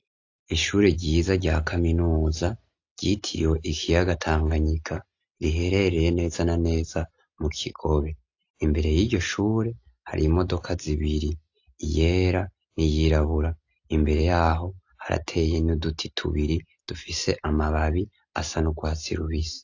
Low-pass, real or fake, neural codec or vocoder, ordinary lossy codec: 7.2 kHz; real; none; AAC, 48 kbps